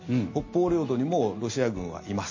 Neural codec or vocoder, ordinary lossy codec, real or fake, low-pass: none; MP3, 32 kbps; real; 7.2 kHz